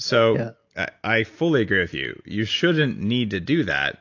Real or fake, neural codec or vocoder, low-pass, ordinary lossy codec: real; none; 7.2 kHz; AAC, 48 kbps